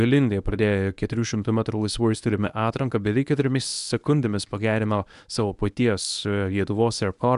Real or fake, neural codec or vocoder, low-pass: fake; codec, 24 kHz, 0.9 kbps, WavTokenizer, medium speech release version 1; 10.8 kHz